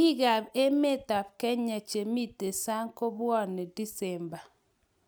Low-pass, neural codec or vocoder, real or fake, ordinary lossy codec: none; none; real; none